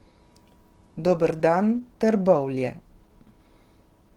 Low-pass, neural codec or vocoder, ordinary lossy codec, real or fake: 19.8 kHz; autoencoder, 48 kHz, 128 numbers a frame, DAC-VAE, trained on Japanese speech; Opus, 16 kbps; fake